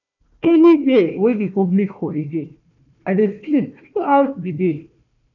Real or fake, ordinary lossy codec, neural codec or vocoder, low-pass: fake; none; codec, 16 kHz, 1 kbps, FunCodec, trained on Chinese and English, 50 frames a second; 7.2 kHz